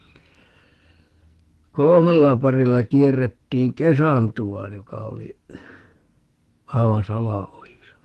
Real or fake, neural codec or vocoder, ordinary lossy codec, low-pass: fake; codec, 44.1 kHz, 2.6 kbps, SNAC; Opus, 24 kbps; 14.4 kHz